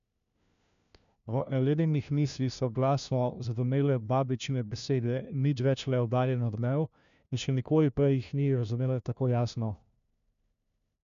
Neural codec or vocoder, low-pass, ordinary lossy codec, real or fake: codec, 16 kHz, 1 kbps, FunCodec, trained on LibriTTS, 50 frames a second; 7.2 kHz; none; fake